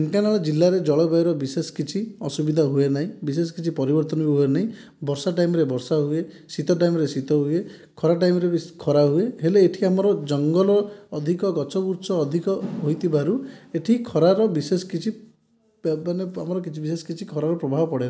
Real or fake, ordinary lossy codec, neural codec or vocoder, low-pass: real; none; none; none